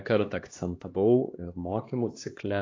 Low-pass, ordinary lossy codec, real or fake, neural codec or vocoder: 7.2 kHz; AAC, 32 kbps; fake; codec, 16 kHz, 2 kbps, X-Codec, HuBERT features, trained on LibriSpeech